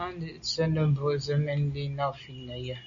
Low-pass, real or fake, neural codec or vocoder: 7.2 kHz; real; none